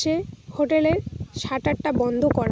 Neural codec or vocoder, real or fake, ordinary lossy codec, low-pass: none; real; none; none